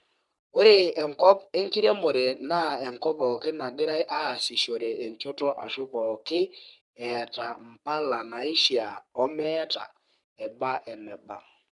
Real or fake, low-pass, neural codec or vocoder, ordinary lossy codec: fake; 10.8 kHz; codec, 44.1 kHz, 3.4 kbps, Pupu-Codec; none